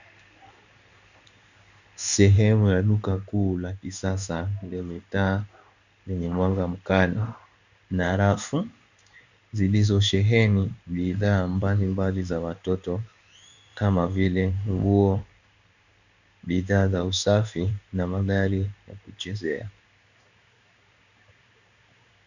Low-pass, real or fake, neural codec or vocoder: 7.2 kHz; fake; codec, 16 kHz in and 24 kHz out, 1 kbps, XY-Tokenizer